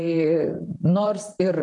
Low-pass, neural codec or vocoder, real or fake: 10.8 kHz; vocoder, 44.1 kHz, 128 mel bands, Pupu-Vocoder; fake